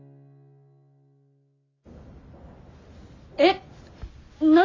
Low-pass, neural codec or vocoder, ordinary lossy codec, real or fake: 7.2 kHz; none; AAC, 32 kbps; real